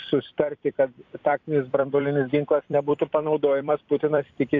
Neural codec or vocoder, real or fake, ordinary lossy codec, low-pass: vocoder, 44.1 kHz, 128 mel bands every 256 samples, BigVGAN v2; fake; AAC, 48 kbps; 7.2 kHz